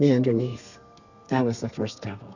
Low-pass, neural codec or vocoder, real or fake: 7.2 kHz; codec, 32 kHz, 1.9 kbps, SNAC; fake